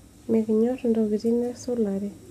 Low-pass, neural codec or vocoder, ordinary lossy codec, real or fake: 14.4 kHz; none; none; real